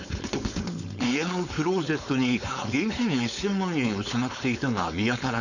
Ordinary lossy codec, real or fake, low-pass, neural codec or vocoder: none; fake; 7.2 kHz; codec, 16 kHz, 4.8 kbps, FACodec